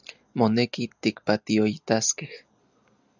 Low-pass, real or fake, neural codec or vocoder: 7.2 kHz; real; none